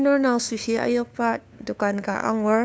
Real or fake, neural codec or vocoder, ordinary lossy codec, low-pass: fake; codec, 16 kHz, 2 kbps, FunCodec, trained on LibriTTS, 25 frames a second; none; none